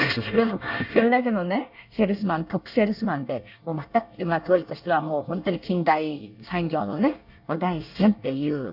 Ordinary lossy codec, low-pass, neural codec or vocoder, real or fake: none; 5.4 kHz; codec, 24 kHz, 1 kbps, SNAC; fake